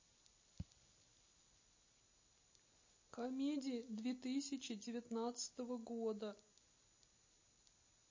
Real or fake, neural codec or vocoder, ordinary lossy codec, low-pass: real; none; MP3, 32 kbps; 7.2 kHz